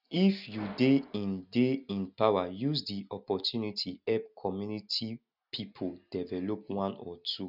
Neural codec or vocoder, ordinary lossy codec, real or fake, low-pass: none; none; real; 5.4 kHz